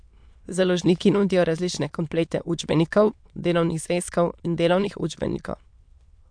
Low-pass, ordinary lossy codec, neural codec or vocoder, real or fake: 9.9 kHz; MP3, 64 kbps; autoencoder, 22.05 kHz, a latent of 192 numbers a frame, VITS, trained on many speakers; fake